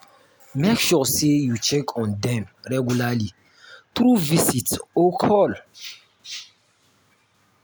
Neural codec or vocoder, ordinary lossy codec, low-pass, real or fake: none; none; none; real